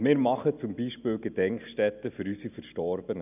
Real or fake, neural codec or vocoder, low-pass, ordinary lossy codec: real; none; 3.6 kHz; none